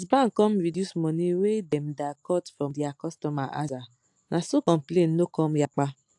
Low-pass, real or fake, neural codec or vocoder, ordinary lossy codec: 10.8 kHz; real; none; AAC, 64 kbps